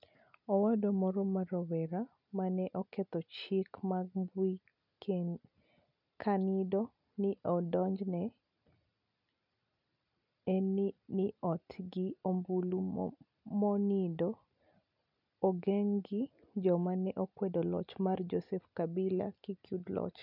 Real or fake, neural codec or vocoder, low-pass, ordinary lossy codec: real; none; 5.4 kHz; none